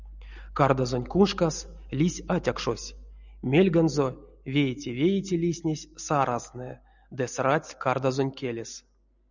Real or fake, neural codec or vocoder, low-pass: real; none; 7.2 kHz